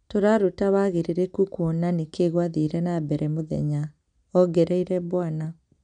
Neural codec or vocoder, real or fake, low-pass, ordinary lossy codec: none; real; 9.9 kHz; none